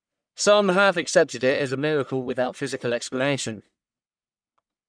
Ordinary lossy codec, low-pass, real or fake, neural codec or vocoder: none; 9.9 kHz; fake; codec, 44.1 kHz, 1.7 kbps, Pupu-Codec